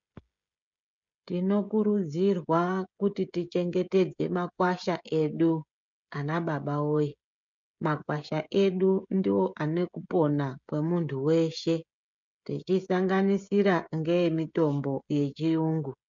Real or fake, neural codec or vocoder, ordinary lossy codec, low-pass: fake; codec, 16 kHz, 16 kbps, FreqCodec, smaller model; MP3, 64 kbps; 7.2 kHz